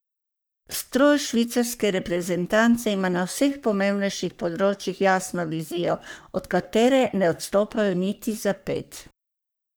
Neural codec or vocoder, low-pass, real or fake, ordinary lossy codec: codec, 44.1 kHz, 3.4 kbps, Pupu-Codec; none; fake; none